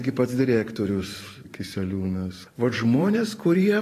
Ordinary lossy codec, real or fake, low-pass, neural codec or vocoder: AAC, 48 kbps; fake; 14.4 kHz; vocoder, 44.1 kHz, 128 mel bands every 512 samples, BigVGAN v2